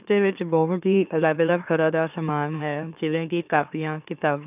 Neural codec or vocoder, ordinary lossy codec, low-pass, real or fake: autoencoder, 44.1 kHz, a latent of 192 numbers a frame, MeloTTS; none; 3.6 kHz; fake